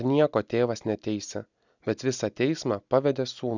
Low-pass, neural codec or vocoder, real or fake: 7.2 kHz; none; real